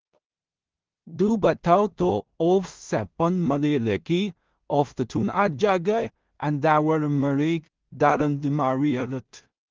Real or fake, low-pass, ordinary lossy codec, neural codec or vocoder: fake; 7.2 kHz; Opus, 32 kbps; codec, 16 kHz in and 24 kHz out, 0.4 kbps, LongCat-Audio-Codec, two codebook decoder